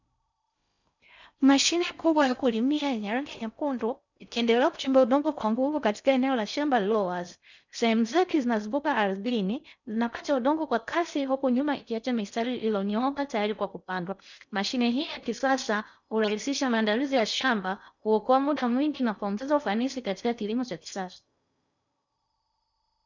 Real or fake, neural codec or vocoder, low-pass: fake; codec, 16 kHz in and 24 kHz out, 0.6 kbps, FocalCodec, streaming, 4096 codes; 7.2 kHz